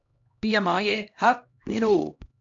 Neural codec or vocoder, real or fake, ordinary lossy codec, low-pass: codec, 16 kHz, 1 kbps, X-Codec, HuBERT features, trained on LibriSpeech; fake; AAC, 64 kbps; 7.2 kHz